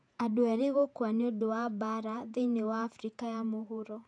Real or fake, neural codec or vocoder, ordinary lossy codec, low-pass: fake; vocoder, 48 kHz, 128 mel bands, Vocos; none; 9.9 kHz